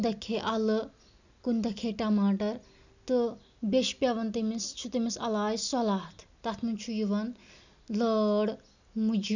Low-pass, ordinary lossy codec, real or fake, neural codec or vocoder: 7.2 kHz; none; real; none